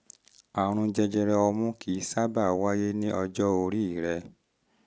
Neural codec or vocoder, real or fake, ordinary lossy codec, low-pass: none; real; none; none